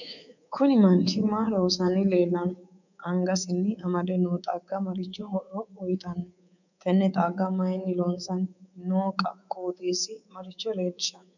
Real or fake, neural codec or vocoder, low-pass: fake; codec, 24 kHz, 3.1 kbps, DualCodec; 7.2 kHz